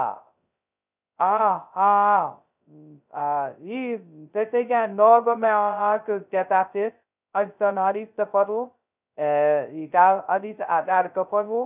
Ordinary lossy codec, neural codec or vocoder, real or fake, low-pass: none; codec, 16 kHz, 0.2 kbps, FocalCodec; fake; 3.6 kHz